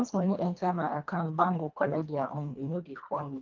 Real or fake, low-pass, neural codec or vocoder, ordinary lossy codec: fake; 7.2 kHz; codec, 24 kHz, 1.5 kbps, HILCodec; Opus, 24 kbps